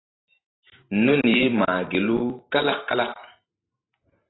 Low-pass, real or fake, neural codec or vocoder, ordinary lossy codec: 7.2 kHz; fake; vocoder, 44.1 kHz, 128 mel bands every 512 samples, BigVGAN v2; AAC, 16 kbps